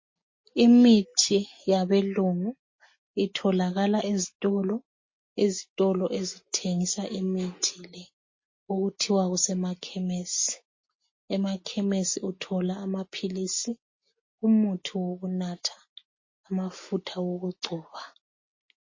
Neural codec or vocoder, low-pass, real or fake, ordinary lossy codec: none; 7.2 kHz; real; MP3, 32 kbps